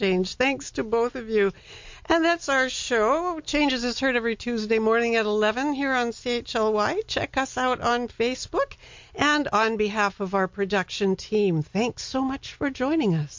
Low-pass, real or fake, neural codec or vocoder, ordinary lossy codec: 7.2 kHz; real; none; MP3, 48 kbps